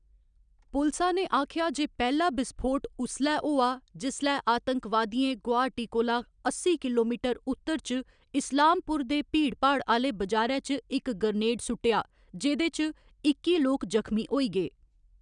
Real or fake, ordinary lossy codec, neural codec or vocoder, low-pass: real; none; none; 9.9 kHz